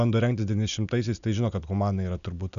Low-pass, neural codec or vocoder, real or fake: 7.2 kHz; none; real